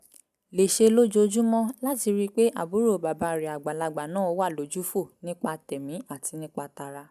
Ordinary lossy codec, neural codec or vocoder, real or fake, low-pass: none; none; real; 14.4 kHz